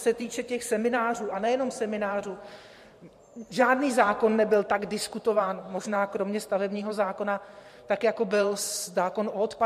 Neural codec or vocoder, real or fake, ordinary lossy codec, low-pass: vocoder, 44.1 kHz, 128 mel bands every 512 samples, BigVGAN v2; fake; MP3, 64 kbps; 14.4 kHz